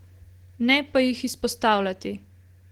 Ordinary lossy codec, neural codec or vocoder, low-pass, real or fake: Opus, 16 kbps; none; 19.8 kHz; real